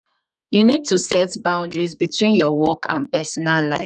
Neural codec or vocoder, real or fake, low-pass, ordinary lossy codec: codec, 32 kHz, 1.9 kbps, SNAC; fake; 10.8 kHz; none